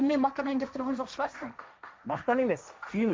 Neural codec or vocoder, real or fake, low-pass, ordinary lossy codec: codec, 16 kHz, 1.1 kbps, Voila-Tokenizer; fake; none; none